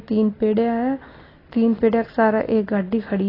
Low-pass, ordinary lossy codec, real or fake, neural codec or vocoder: 5.4 kHz; AAC, 24 kbps; real; none